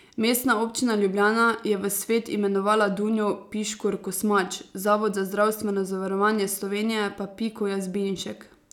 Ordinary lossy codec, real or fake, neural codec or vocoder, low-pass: none; real; none; 19.8 kHz